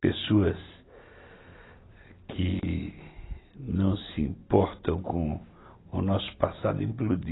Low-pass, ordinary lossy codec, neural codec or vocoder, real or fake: 7.2 kHz; AAC, 16 kbps; none; real